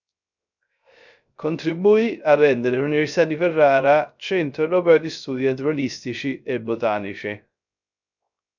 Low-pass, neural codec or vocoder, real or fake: 7.2 kHz; codec, 16 kHz, 0.3 kbps, FocalCodec; fake